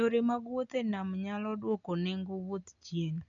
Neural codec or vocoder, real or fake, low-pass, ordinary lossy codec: none; real; 7.2 kHz; none